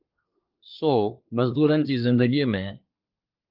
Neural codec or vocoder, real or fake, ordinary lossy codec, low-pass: codec, 24 kHz, 1 kbps, SNAC; fake; Opus, 24 kbps; 5.4 kHz